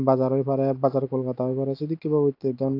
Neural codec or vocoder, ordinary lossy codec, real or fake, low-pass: none; AAC, 32 kbps; real; 5.4 kHz